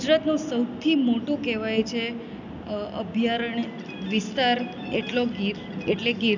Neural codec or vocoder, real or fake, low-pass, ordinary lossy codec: none; real; 7.2 kHz; none